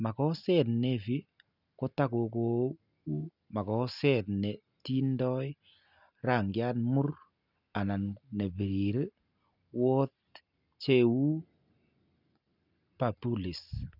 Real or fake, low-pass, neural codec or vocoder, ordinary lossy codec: real; 5.4 kHz; none; none